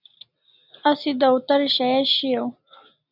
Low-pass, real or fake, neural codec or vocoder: 5.4 kHz; real; none